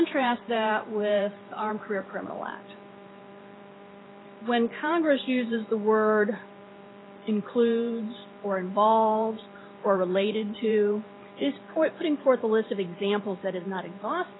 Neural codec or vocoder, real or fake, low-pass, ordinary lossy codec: vocoder, 44.1 kHz, 128 mel bands every 512 samples, BigVGAN v2; fake; 7.2 kHz; AAC, 16 kbps